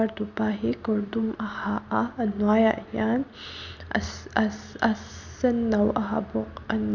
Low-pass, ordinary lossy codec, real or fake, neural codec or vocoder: 7.2 kHz; none; real; none